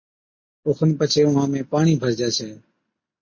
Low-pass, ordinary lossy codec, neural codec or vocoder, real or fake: 7.2 kHz; MP3, 32 kbps; none; real